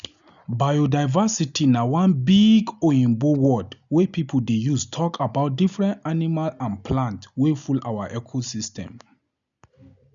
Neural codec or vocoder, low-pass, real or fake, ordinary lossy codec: none; 7.2 kHz; real; none